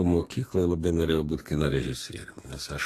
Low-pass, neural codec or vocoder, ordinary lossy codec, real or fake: 14.4 kHz; codec, 32 kHz, 1.9 kbps, SNAC; AAC, 48 kbps; fake